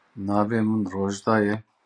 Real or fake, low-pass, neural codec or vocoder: real; 9.9 kHz; none